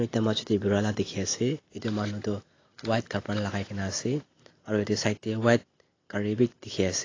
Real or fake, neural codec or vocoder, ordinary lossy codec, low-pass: real; none; AAC, 32 kbps; 7.2 kHz